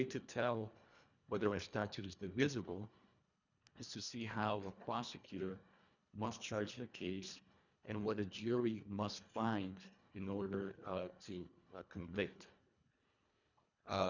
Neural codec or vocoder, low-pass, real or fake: codec, 24 kHz, 1.5 kbps, HILCodec; 7.2 kHz; fake